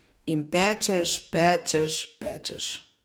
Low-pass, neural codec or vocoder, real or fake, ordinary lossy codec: none; codec, 44.1 kHz, 2.6 kbps, DAC; fake; none